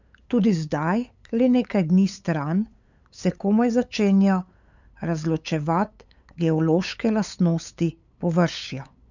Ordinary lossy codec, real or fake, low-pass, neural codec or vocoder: none; fake; 7.2 kHz; codec, 16 kHz, 8 kbps, FunCodec, trained on LibriTTS, 25 frames a second